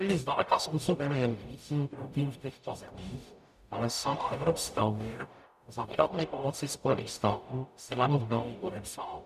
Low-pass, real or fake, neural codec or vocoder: 14.4 kHz; fake; codec, 44.1 kHz, 0.9 kbps, DAC